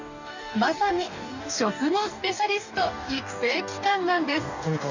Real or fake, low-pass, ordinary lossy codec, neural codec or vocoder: fake; 7.2 kHz; none; codec, 44.1 kHz, 2.6 kbps, DAC